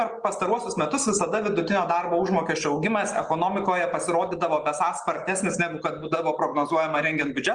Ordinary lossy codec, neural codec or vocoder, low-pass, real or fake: Opus, 24 kbps; none; 9.9 kHz; real